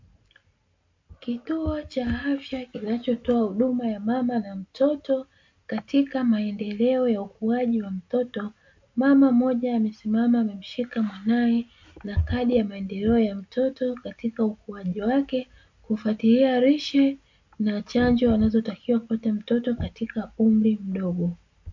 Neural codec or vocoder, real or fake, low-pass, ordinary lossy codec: none; real; 7.2 kHz; MP3, 48 kbps